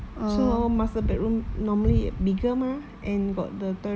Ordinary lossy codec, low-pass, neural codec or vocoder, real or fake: none; none; none; real